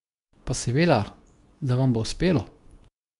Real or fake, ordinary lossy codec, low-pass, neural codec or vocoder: fake; none; 10.8 kHz; codec, 24 kHz, 0.9 kbps, WavTokenizer, medium speech release version 2